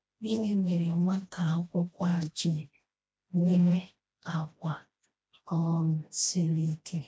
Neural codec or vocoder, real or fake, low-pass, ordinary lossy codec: codec, 16 kHz, 1 kbps, FreqCodec, smaller model; fake; none; none